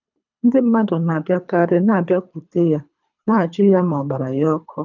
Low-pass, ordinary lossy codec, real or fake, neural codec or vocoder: 7.2 kHz; none; fake; codec, 24 kHz, 3 kbps, HILCodec